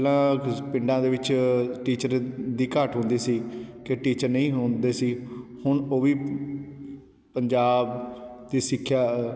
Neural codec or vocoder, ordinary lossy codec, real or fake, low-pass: none; none; real; none